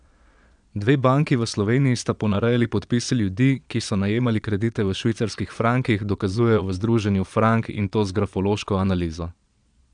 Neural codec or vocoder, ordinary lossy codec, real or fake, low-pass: vocoder, 22.05 kHz, 80 mel bands, Vocos; none; fake; 9.9 kHz